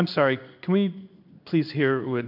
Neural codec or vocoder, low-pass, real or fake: codec, 16 kHz in and 24 kHz out, 1 kbps, XY-Tokenizer; 5.4 kHz; fake